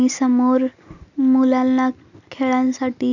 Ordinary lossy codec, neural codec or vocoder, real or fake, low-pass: none; none; real; 7.2 kHz